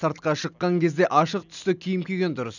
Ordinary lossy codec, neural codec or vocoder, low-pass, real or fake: none; none; 7.2 kHz; real